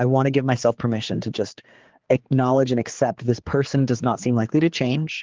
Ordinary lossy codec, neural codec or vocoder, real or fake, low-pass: Opus, 16 kbps; codec, 16 kHz, 4 kbps, X-Codec, HuBERT features, trained on general audio; fake; 7.2 kHz